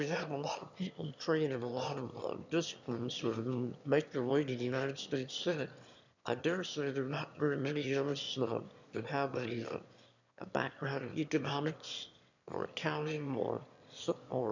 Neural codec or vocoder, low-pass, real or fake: autoencoder, 22.05 kHz, a latent of 192 numbers a frame, VITS, trained on one speaker; 7.2 kHz; fake